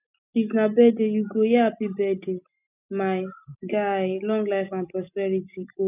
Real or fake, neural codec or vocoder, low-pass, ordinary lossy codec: real; none; 3.6 kHz; none